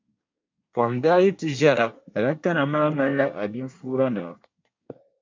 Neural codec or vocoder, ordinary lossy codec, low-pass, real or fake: codec, 24 kHz, 1 kbps, SNAC; MP3, 64 kbps; 7.2 kHz; fake